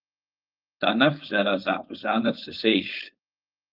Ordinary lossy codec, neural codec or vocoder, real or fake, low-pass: Opus, 32 kbps; codec, 16 kHz, 4.8 kbps, FACodec; fake; 5.4 kHz